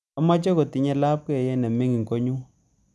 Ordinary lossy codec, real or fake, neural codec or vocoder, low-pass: none; real; none; none